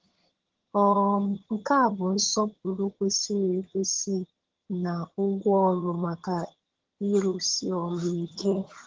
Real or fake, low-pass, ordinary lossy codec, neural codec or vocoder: fake; 7.2 kHz; Opus, 16 kbps; vocoder, 22.05 kHz, 80 mel bands, HiFi-GAN